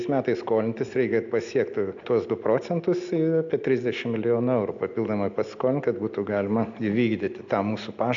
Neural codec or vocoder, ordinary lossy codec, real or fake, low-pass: none; AAC, 64 kbps; real; 7.2 kHz